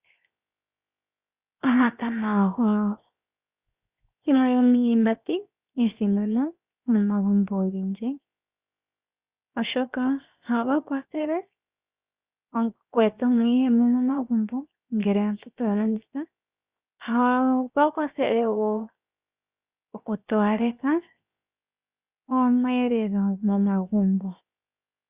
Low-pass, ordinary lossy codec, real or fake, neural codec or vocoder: 3.6 kHz; Opus, 64 kbps; fake; codec, 16 kHz, 0.7 kbps, FocalCodec